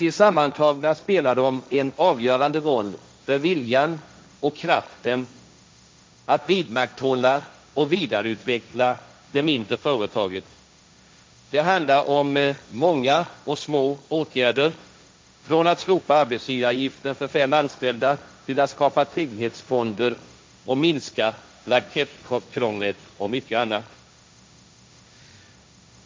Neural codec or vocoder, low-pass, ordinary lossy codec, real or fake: codec, 16 kHz, 1.1 kbps, Voila-Tokenizer; none; none; fake